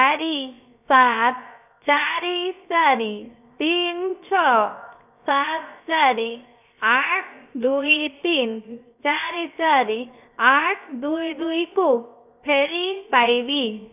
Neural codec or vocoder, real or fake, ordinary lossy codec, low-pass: codec, 16 kHz, 0.7 kbps, FocalCodec; fake; none; 3.6 kHz